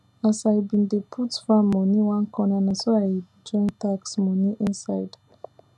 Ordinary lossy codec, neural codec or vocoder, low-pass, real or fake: none; none; none; real